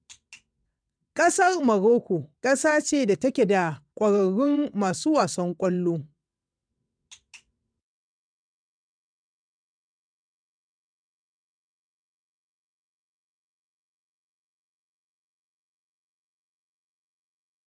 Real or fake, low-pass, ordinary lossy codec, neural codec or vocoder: fake; 9.9 kHz; none; vocoder, 22.05 kHz, 80 mel bands, WaveNeXt